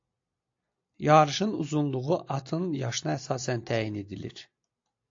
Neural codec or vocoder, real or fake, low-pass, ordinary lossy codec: none; real; 7.2 kHz; AAC, 48 kbps